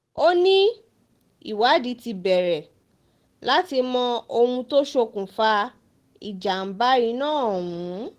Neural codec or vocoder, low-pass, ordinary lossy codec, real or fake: none; 14.4 kHz; Opus, 16 kbps; real